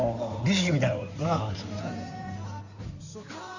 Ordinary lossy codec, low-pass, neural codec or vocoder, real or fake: none; 7.2 kHz; codec, 16 kHz in and 24 kHz out, 2.2 kbps, FireRedTTS-2 codec; fake